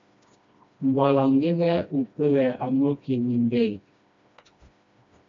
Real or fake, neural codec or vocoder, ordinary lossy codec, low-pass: fake; codec, 16 kHz, 1 kbps, FreqCodec, smaller model; MP3, 48 kbps; 7.2 kHz